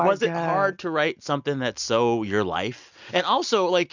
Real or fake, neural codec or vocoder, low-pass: real; none; 7.2 kHz